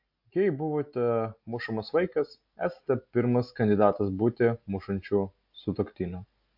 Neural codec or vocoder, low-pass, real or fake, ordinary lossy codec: none; 5.4 kHz; real; AAC, 48 kbps